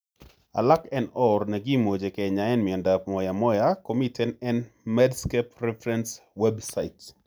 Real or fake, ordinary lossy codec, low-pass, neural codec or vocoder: real; none; none; none